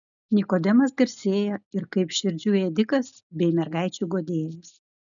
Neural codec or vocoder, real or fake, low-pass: none; real; 7.2 kHz